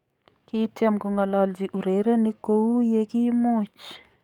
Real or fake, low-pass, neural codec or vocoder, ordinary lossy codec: fake; 19.8 kHz; codec, 44.1 kHz, 7.8 kbps, DAC; MP3, 96 kbps